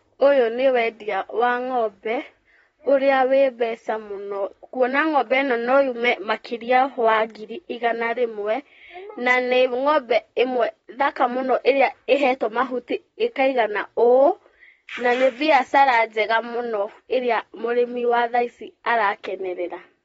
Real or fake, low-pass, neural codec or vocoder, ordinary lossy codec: fake; 19.8 kHz; vocoder, 44.1 kHz, 128 mel bands, Pupu-Vocoder; AAC, 24 kbps